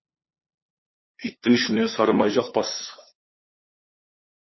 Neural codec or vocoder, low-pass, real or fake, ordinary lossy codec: codec, 16 kHz, 2 kbps, FunCodec, trained on LibriTTS, 25 frames a second; 7.2 kHz; fake; MP3, 24 kbps